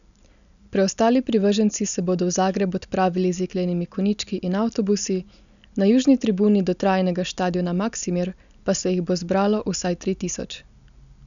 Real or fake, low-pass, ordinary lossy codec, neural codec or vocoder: real; 7.2 kHz; none; none